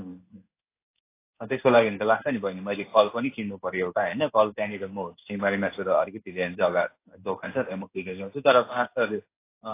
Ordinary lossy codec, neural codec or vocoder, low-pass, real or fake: AAC, 24 kbps; codec, 16 kHz in and 24 kHz out, 1 kbps, XY-Tokenizer; 3.6 kHz; fake